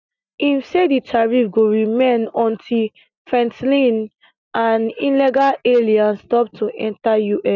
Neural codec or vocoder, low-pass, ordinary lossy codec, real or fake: none; 7.2 kHz; none; real